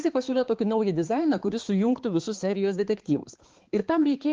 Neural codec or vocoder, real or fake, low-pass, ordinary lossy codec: codec, 16 kHz, 4 kbps, X-Codec, HuBERT features, trained on balanced general audio; fake; 7.2 kHz; Opus, 16 kbps